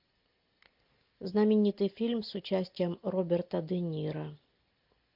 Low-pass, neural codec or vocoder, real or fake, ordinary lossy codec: 5.4 kHz; none; real; MP3, 48 kbps